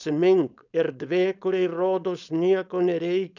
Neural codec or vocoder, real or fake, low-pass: codec, 16 kHz, 4.8 kbps, FACodec; fake; 7.2 kHz